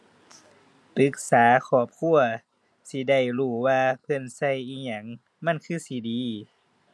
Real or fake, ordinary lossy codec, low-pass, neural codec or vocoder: real; none; none; none